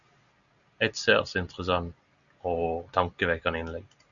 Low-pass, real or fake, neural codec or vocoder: 7.2 kHz; real; none